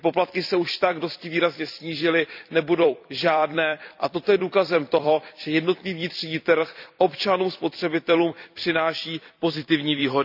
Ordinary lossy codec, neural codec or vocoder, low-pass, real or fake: none; vocoder, 44.1 kHz, 128 mel bands every 256 samples, BigVGAN v2; 5.4 kHz; fake